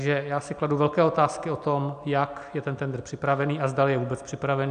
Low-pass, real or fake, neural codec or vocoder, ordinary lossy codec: 9.9 kHz; real; none; AAC, 96 kbps